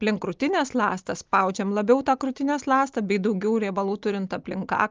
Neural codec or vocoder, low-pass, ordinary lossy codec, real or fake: none; 7.2 kHz; Opus, 24 kbps; real